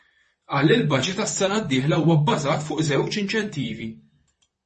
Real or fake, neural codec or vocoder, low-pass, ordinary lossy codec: fake; vocoder, 44.1 kHz, 128 mel bands, Pupu-Vocoder; 10.8 kHz; MP3, 32 kbps